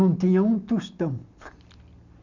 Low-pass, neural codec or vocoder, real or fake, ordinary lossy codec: 7.2 kHz; none; real; none